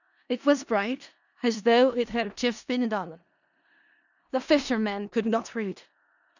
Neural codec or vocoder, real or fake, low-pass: codec, 16 kHz in and 24 kHz out, 0.4 kbps, LongCat-Audio-Codec, four codebook decoder; fake; 7.2 kHz